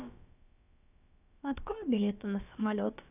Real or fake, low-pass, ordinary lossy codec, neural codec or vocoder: fake; 3.6 kHz; none; codec, 16 kHz, about 1 kbps, DyCAST, with the encoder's durations